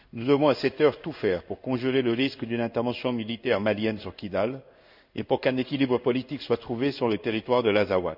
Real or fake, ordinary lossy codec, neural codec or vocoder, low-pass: fake; none; codec, 16 kHz in and 24 kHz out, 1 kbps, XY-Tokenizer; 5.4 kHz